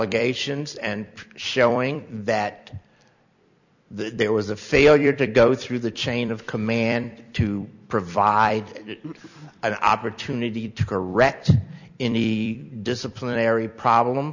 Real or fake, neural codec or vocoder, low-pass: fake; vocoder, 44.1 kHz, 128 mel bands every 256 samples, BigVGAN v2; 7.2 kHz